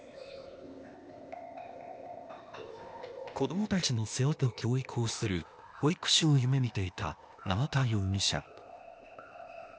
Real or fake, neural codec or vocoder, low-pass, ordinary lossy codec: fake; codec, 16 kHz, 0.8 kbps, ZipCodec; none; none